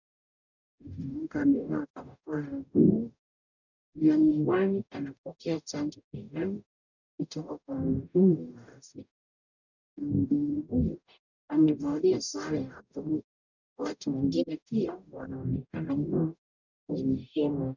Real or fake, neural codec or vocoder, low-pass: fake; codec, 44.1 kHz, 0.9 kbps, DAC; 7.2 kHz